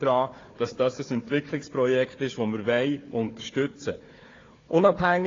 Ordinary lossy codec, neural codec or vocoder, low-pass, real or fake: AAC, 32 kbps; codec, 16 kHz, 4 kbps, FunCodec, trained on Chinese and English, 50 frames a second; 7.2 kHz; fake